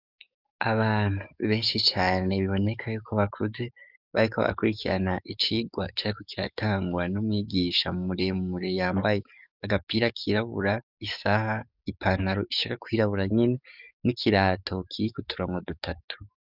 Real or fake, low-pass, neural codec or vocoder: fake; 5.4 kHz; codec, 44.1 kHz, 7.8 kbps, DAC